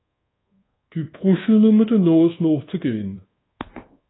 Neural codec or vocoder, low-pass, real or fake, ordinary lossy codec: codec, 24 kHz, 1.2 kbps, DualCodec; 7.2 kHz; fake; AAC, 16 kbps